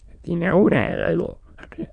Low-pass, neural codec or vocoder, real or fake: 9.9 kHz; autoencoder, 22.05 kHz, a latent of 192 numbers a frame, VITS, trained on many speakers; fake